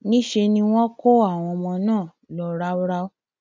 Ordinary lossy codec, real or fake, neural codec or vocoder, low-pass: none; real; none; none